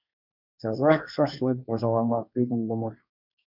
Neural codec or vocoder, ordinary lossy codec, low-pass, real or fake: codec, 24 kHz, 1 kbps, SNAC; MP3, 48 kbps; 5.4 kHz; fake